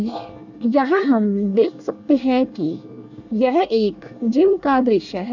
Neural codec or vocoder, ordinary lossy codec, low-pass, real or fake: codec, 24 kHz, 1 kbps, SNAC; none; 7.2 kHz; fake